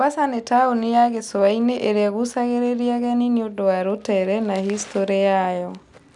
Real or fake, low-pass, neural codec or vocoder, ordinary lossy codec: real; 10.8 kHz; none; none